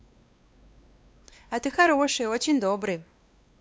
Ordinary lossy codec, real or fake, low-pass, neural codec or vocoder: none; fake; none; codec, 16 kHz, 2 kbps, X-Codec, WavLM features, trained on Multilingual LibriSpeech